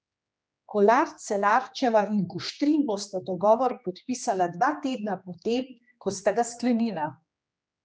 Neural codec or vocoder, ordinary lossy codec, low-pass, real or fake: codec, 16 kHz, 2 kbps, X-Codec, HuBERT features, trained on general audio; none; none; fake